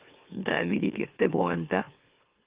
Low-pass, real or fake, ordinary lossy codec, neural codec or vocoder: 3.6 kHz; fake; Opus, 24 kbps; autoencoder, 44.1 kHz, a latent of 192 numbers a frame, MeloTTS